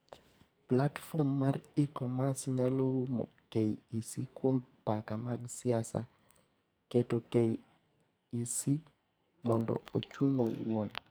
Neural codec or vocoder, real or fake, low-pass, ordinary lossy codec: codec, 44.1 kHz, 2.6 kbps, SNAC; fake; none; none